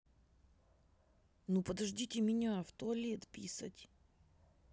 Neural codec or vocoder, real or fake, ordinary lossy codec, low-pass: none; real; none; none